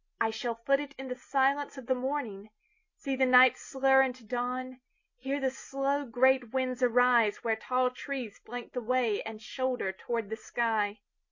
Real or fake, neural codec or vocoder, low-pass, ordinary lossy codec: real; none; 7.2 kHz; MP3, 48 kbps